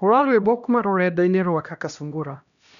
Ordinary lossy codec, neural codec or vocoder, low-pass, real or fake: none; codec, 16 kHz, 1 kbps, X-Codec, HuBERT features, trained on LibriSpeech; 7.2 kHz; fake